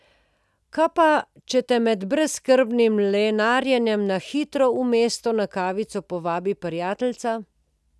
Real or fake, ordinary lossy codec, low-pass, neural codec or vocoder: real; none; none; none